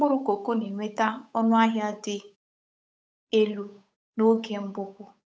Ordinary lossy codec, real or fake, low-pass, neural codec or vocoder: none; fake; none; codec, 16 kHz, 8 kbps, FunCodec, trained on Chinese and English, 25 frames a second